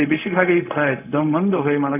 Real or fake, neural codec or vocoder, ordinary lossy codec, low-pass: fake; codec, 16 kHz, 0.4 kbps, LongCat-Audio-Codec; none; 3.6 kHz